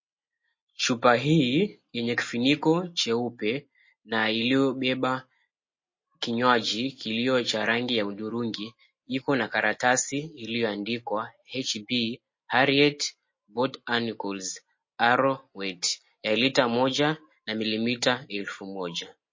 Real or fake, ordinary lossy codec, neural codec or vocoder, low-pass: real; MP3, 32 kbps; none; 7.2 kHz